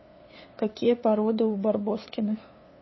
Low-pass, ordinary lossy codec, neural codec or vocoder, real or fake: 7.2 kHz; MP3, 24 kbps; codec, 16 kHz, 2 kbps, FunCodec, trained on LibriTTS, 25 frames a second; fake